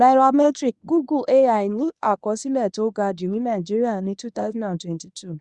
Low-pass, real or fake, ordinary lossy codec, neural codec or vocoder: 10.8 kHz; fake; Opus, 64 kbps; codec, 24 kHz, 0.9 kbps, WavTokenizer, medium speech release version 1